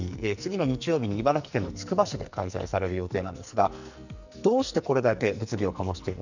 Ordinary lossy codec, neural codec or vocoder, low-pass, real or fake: none; codec, 44.1 kHz, 2.6 kbps, SNAC; 7.2 kHz; fake